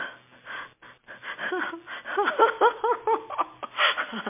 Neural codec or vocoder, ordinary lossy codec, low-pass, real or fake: none; MP3, 24 kbps; 3.6 kHz; real